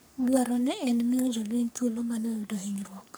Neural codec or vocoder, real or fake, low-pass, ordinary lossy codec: codec, 44.1 kHz, 3.4 kbps, Pupu-Codec; fake; none; none